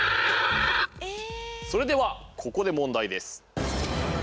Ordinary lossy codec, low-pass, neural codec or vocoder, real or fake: none; none; none; real